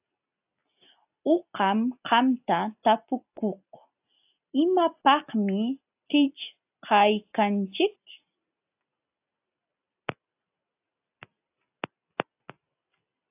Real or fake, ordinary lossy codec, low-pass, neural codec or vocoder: real; AAC, 32 kbps; 3.6 kHz; none